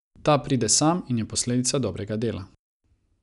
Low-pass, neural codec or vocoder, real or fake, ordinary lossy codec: 10.8 kHz; none; real; none